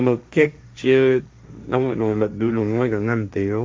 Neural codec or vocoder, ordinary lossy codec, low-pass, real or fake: codec, 16 kHz, 1.1 kbps, Voila-Tokenizer; none; none; fake